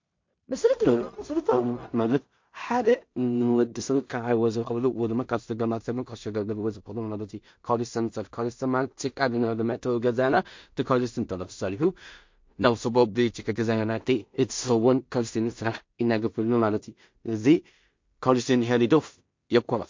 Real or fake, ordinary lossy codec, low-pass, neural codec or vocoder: fake; MP3, 48 kbps; 7.2 kHz; codec, 16 kHz in and 24 kHz out, 0.4 kbps, LongCat-Audio-Codec, two codebook decoder